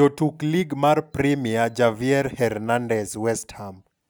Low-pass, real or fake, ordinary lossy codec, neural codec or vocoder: none; real; none; none